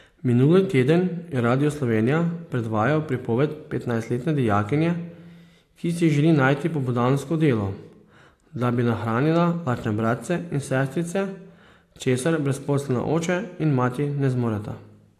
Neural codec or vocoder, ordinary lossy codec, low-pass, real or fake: none; AAC, 64 kbps; 14.4 kHz; real